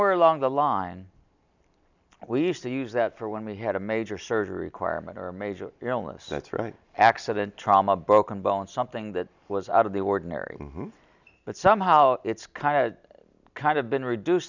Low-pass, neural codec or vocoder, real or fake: 7.2 kHz; none; real